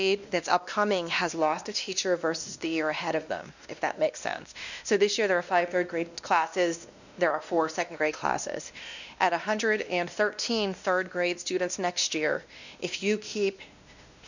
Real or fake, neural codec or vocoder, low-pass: fake; codec, 16 kHz, 1 kbps, X-Codec, WavLM features, trained on Multilingual LibriSpeech; 7.2 kHz